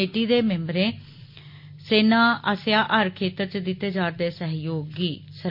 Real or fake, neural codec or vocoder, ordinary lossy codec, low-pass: real; none; MP3, 32 kbps; 5.4 kHz